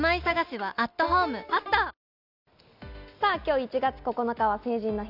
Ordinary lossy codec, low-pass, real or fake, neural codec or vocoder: none; 5.4 kHz; real; none